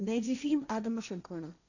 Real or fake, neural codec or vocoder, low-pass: fake; codec, 16 kHz, 1.1 kbps, Voila-Tokenizer; 7.2 kHz